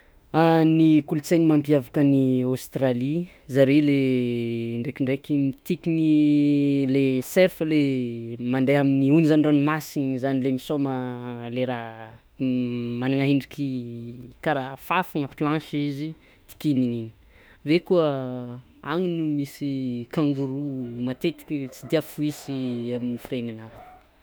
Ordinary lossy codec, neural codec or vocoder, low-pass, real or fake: none; autoencoder, 48 kHz, 32 numbers a frame, DAC-VAE, trained on Japanese speech; none; fake